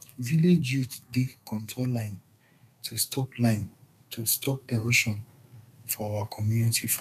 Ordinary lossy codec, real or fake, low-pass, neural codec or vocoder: none; fake; 14.4 kHz; codec, 32 kHz, 1.9 kbps, SNAC